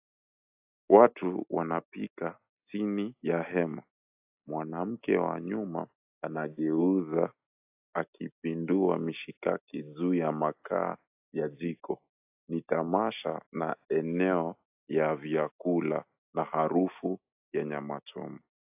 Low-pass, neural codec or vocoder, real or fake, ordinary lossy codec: 3.6 kHz; none; real; AAC, 32 kbps